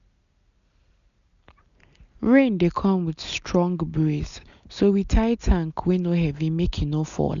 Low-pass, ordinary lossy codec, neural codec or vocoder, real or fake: 7.2 kHz; none; none; real